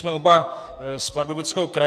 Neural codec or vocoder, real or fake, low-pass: codec, 44.1 kHz, 2.6 kbps, SNAC; fake; 14.4 kHz